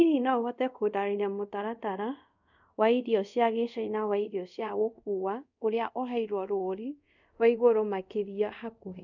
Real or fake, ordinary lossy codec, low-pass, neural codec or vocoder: fake; none; 7.2 kHz; codec, 24 kHz, 0.5 kbps, DualCodec